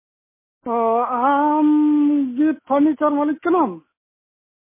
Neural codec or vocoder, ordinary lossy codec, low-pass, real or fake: none; MP3, 16 kbps; 3.6 kHz; real